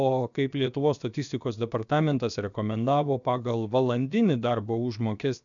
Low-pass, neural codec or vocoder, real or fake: 7.2 kHz; codec, 16 kHz, 0.7 kbps, FocalCodec; fake